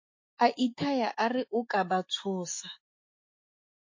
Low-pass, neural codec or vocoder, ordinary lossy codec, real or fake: 7.2 kHz; autoencoder, 48 kHz, 128 numbers a frame, DAC-VAE, trained on Japanese speech; MP3, 32 kbps; fake